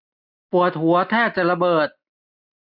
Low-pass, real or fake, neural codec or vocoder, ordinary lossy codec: 5.4 kHz; real; none; none